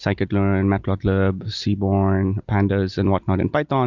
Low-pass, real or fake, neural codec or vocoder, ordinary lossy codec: 7.2 kHz; real; none; Opus, 64 kbps